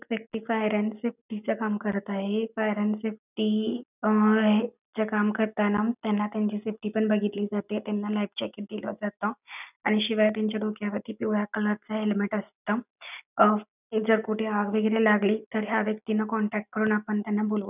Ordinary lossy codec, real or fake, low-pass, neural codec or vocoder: none; real; 3.6 kHz; none